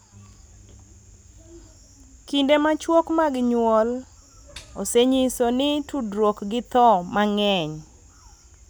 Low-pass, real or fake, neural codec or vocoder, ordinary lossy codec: none; real; none; none